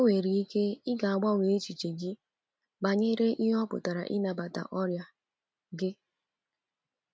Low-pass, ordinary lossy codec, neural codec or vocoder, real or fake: none; none; none; real